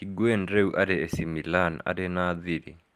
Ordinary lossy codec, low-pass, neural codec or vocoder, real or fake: Opus, 32 kbps; 14.4 kHz; none; real